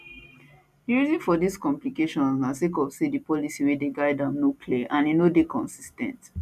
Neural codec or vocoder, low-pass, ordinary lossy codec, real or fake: none; 14.4 kHz; none; real